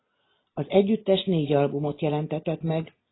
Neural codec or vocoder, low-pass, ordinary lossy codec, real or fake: none; 7.2 kHz; AAC, 16 kbps; real